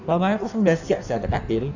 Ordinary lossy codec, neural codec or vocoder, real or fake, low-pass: none; codec, 16 kHz in and 24 kHz out, 1.1 kbps, FireRedTTS-2 codec; fake; 7.2 kHz